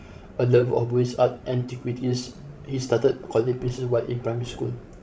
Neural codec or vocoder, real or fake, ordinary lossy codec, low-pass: codec, 16 kHz, 16 kbps, FreqCodec, larger model; fake; none; none